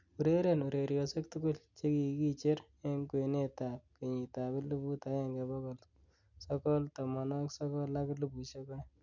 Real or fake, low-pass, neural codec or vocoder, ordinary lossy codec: real; 7.2 kHz; none; none